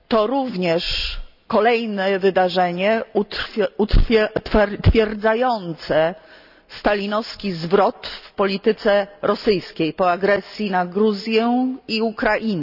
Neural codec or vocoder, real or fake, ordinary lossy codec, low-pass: none; real; none; 5.4 kHz